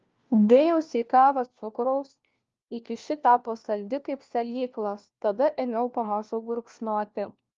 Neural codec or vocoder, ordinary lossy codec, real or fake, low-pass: codec, 16 kHz, 1 kbps, FunCodec, trained on LibriTTS, 50 frames a second; Opus, 32 kbps; fake; 7.2 kHz